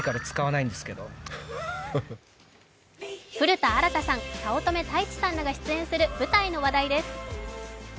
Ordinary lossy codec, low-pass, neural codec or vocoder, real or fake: none; none; none; real